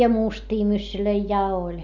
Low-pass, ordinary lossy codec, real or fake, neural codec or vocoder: 7.2 kHz; none; real; none